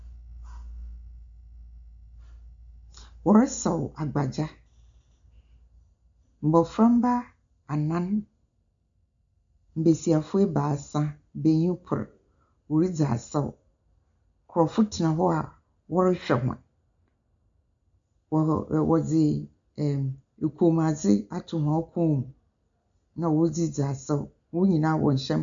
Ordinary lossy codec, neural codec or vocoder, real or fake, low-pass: MP3, 64 kbps; none; real; 7.2 kHz